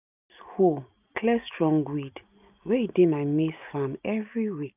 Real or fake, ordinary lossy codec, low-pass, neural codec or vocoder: real; none; 3.6 kHz; none